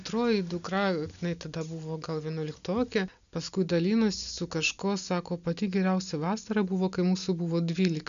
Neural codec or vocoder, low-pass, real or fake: none; 7.2 kHz; real